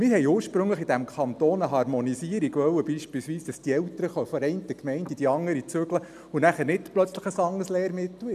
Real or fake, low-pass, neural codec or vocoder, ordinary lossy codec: real; 14.4 kHz; none; none